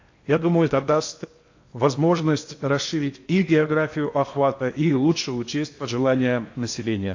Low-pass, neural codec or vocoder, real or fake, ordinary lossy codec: 7.2 kHz; codec, 16 kHz in and 24 kHz out, 0.8 kbps, FocalCodec, streaming, 65536 codes; fake; AAC, 48 kbps